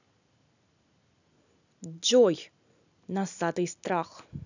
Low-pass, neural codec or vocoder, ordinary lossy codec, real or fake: 7.2 kHz; none; none; real